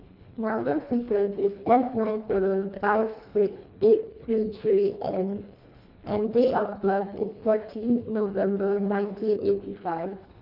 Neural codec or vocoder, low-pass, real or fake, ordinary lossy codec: codec, 24 kHz, 1.5 kbps, HILCodec; 5.4 kHz; fake; none